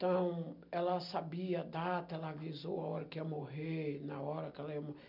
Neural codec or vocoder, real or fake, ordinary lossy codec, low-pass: none; real; MP3, 48 kbps; 5.4 kHz